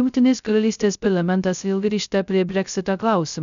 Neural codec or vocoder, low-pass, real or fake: codec, 16 kHz, 0.2 kbps, FocalCodec; 7.2 kHz; fake